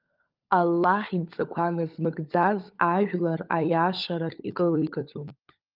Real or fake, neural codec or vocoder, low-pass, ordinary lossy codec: fake; codec, 16 kHz, 16 kbps, FunCodec, trained on LibriTTS, 50 frames a second; 5.4 kHz; Opus, 32 kbps